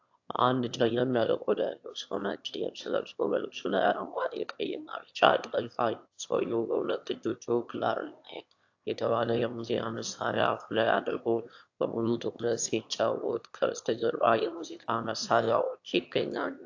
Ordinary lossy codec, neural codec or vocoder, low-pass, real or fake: AAC, 48 kbps; autoencoder, 22.05 kHz, a latent of 192 numbers a frame, VITS, trained on one speaker; 7.2 kHz; fake